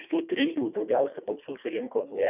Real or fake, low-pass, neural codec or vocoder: fake; 3.6 kHz; codec, 24 kHz, 1.5 kbps, HILCodec